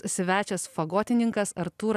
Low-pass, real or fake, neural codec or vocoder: 14.4 kHz; real; none